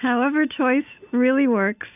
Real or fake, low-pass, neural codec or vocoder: real; 3.6 kHz; none